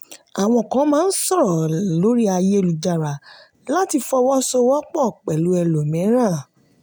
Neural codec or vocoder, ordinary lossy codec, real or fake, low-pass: none; none; real; none